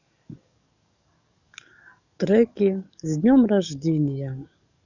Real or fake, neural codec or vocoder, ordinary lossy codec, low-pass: fake; codec, 44.1 kHz, 7.8 kbps, DAC; none; 7.2 kHz